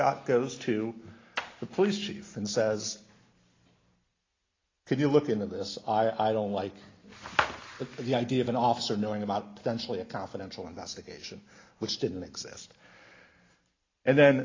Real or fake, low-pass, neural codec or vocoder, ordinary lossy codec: real; 7.2 kHz; none; AAC, 32 kbps